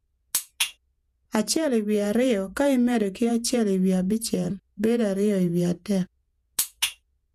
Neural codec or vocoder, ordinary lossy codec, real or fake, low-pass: vocoder, 48 kHz, 128 mel bands, Vocos; none; fake; 14.4 kHz